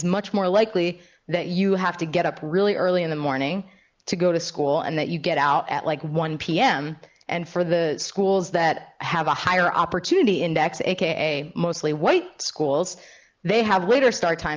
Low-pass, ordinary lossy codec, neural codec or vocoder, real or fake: 7.2 kHz; Opus, 24 kbps; none; real